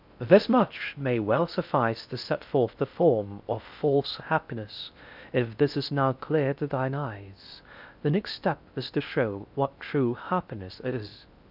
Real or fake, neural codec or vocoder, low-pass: fake; codec, 16 kHz in and 24 kHz out, 0.6 kbps, FocalCodec, streaming, 4096 codes; 5.4 kHz